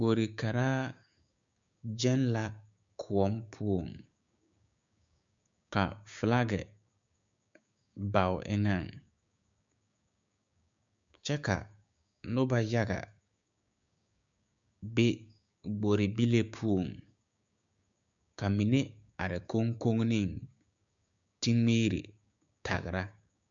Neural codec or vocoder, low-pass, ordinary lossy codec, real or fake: codec, 16 kHz, 6 kbps, DAC; 7.2 kHz; MP3, 64 kbps; fake